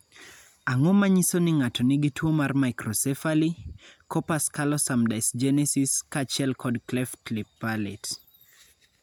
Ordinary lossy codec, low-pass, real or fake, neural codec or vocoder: none; 19.8 kHz; real; none